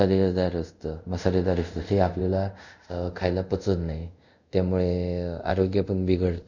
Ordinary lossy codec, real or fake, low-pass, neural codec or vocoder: none; fake; 7.2 kHz; codec, 24 kHz, 0.5 kbps, DualCodec